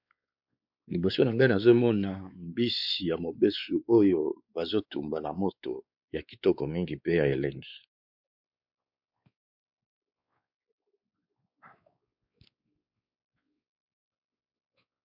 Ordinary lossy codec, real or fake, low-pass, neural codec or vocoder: MP3, 48 kbps; fake; 5.4 kHz; codec, 16 kHz, 4 kbps, X-Codec, WavLM features, trained on Multilingual LibriSpeech